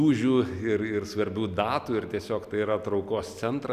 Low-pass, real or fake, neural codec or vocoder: 14.4 kHz; real; none